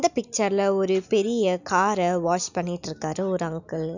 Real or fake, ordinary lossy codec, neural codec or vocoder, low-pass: real; none; none; 7.2 kHz